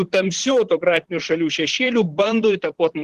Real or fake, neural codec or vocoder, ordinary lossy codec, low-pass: fake; vocoder, 44.1 kHz, 128 mel bands, Pupu-Vocoder; Opus, 16 kbps; 14.4 kHz